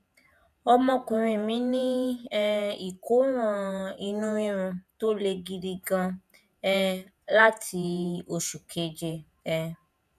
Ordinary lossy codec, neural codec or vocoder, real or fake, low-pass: none; vocoder, 48 kHz, 128 mel bands, Vocos; fake; 14.4 kHz